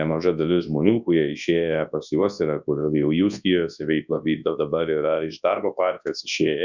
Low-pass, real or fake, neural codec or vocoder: 7.2 kHz; fake; codec, 24 kHz, 0.9 kbps, WavTokenizer, large speech release